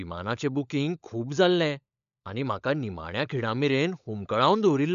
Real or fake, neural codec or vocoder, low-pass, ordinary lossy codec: fake; codec, 16 kHz, 16 kbps, FunCodec, trained on LibriTTS, 50 frames a second; 7.2 kHz; none